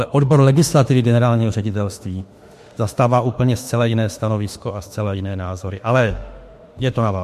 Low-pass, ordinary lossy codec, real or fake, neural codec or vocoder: 14.4 kHz; MP3, 64 kbps; fake; autoencoder, 48 kHz, 32 numbers a frame, DAC-VAE, trained on Japanese speech